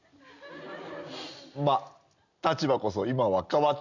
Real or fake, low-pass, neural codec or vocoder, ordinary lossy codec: real; 7.2 kHz; none; none